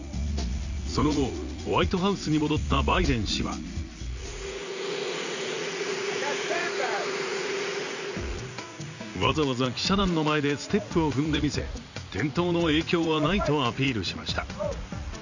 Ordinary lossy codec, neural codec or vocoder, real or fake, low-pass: none; vocoder, 44.1 kHz, 80 mel bands, Vocos; fake; 7.2 kHz